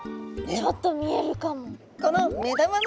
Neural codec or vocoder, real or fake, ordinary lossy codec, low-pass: none; real; none; none